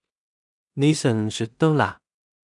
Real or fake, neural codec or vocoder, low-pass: fake; codec, 16 kHz in and 24 kHz out, 0.4 kbps, LongCat-Audio-Codec, two codebook decoder; 10.8 kHz